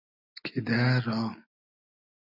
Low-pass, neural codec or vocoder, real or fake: 5.4 kHz; none; real